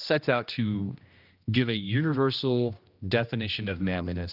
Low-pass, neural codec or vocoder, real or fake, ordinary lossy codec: 5.4 kHz; codec, 16 kHz, 1 kbps, X-Codec, HuBERT features, trained on general audio; fake; Opus, 24 kbps